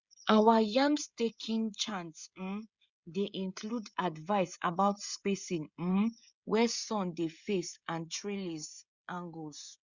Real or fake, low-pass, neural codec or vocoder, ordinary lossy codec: fake; 7.2 kHz; codec, 16 kHz, 16 kbps, FreqCodec, smaller model; Opus, 64 kbps